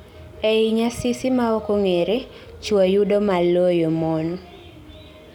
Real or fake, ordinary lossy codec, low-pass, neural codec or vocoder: real; none; 19.8 kHz; none